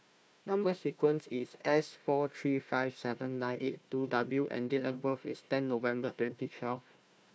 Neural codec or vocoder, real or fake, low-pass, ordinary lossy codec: codec, 16 kHz, 1 kbps, FunCodec, trained on Chinese and English, 50 frames a second; fake; none; none